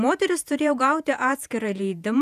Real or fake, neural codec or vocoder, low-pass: fake; vocoder, 48 kHz, 128 mel bands, Vocos; 14.4 kHz